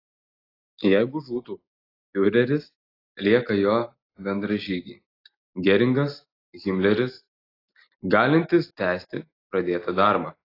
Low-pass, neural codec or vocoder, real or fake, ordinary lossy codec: 5.4 kHz; none; real; AAC, 24 kbps